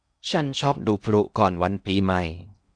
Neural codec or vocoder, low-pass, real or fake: codec, 16 kHz in and 24 kHz out, 0.8 kbps, FocalCodec, streaming, 65536 codes; 9.9 kHz; fake